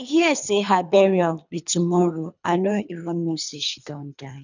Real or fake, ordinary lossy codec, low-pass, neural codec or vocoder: fake; none; 7.2 kHz; codec, 24 kHz, 3 kbps, HILCodec